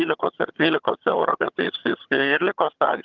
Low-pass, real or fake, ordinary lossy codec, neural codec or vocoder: 7.2 kHz; fake; Opus, 32 kbps; vocoder, 22.05 kHz, 80 mel bands, HiFi-GAN